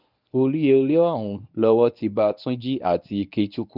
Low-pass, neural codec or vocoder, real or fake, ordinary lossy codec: 5.4 kHz; codec, 24 kHz, 0.9 kbps, WavTokenizer, medium speech release version 1; fake; none